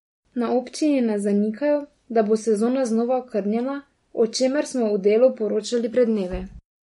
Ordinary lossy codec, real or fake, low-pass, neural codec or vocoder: MP3, 48 kbps; real; 19.8 kHz; none